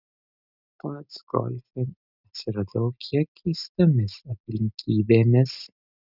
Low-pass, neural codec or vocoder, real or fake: 5.4 kHz; none; real